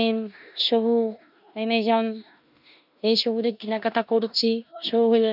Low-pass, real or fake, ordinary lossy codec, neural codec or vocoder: 5.4 kHz; fake; none; codec, 16 kHz in and 24 kHz out, 0.9 kbps, LongCat-Audio-Codec, four codebook decoder